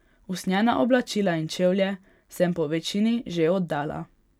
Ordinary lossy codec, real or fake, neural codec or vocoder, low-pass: none; real; none; 19.8 kHz